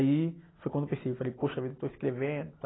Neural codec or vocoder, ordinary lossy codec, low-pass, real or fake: none; AAC, 16 kbps; 7.2 kHz; real